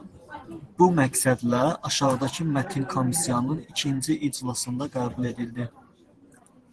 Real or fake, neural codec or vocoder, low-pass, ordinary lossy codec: fake; vocoder, 44.1 kHz, 128 mel bands every 512 samples, BigVGAN v2; 10.8 kHz; Opus, 16 kbps